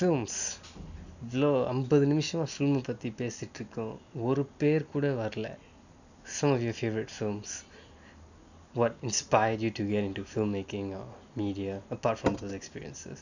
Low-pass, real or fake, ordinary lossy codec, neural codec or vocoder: 7.2 kHz; real; none; none